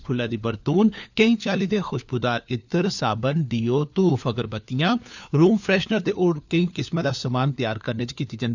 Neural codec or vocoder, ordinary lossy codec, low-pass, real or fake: codec, 16 kHz, 4 kbps, FunCodec, trained on LibriTTS, 50 frames a second; none; 7.2 kHz; fake